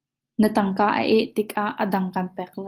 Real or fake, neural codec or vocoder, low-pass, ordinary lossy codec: real; none; 14.4 kHz; Opus, 32 kbps